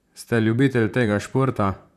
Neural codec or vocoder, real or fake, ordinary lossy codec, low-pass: vocoder, 44.1 kHz, 128 mel bands, Pupu-Vocoder; fake; none; 14.4 kHz